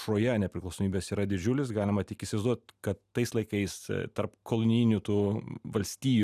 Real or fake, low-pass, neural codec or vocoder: real; 14.4 kHz; none